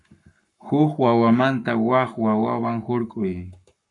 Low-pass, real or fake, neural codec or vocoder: 10.8 kHz; fake; codec, 44.1 kHz, 7.8 kbps, Pupu-Codec